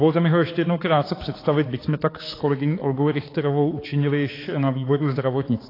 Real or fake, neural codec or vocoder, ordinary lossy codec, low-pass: fake; codec, 16 kHz, 4 kbps, X-Codec, HuBERT features, trained on balanced general audio; AAC, 24 kbps; 5.4 kHz